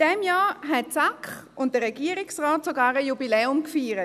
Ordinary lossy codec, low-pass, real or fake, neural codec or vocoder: none; 14.4 kHz; real; none